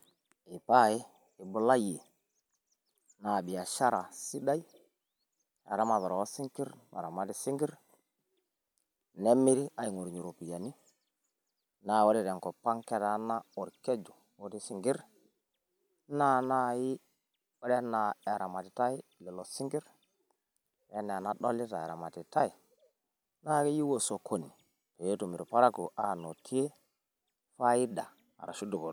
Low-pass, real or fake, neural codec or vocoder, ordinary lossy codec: none; real; none; none